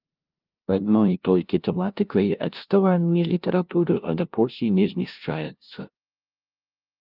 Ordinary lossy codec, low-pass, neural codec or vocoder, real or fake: Opus, 24 kbps; 5.4 kHz; codec, 16 kHz, 0.5 kbps, FunCodec, trained on LibriTTS, 25 frames a second; fake